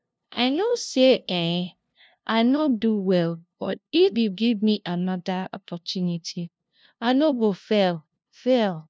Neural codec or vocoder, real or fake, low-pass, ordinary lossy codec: codec, 16 kHz, 0.5 kbps, FunCodec, trained on LibriTTS, 25 frames a second; fake; none; none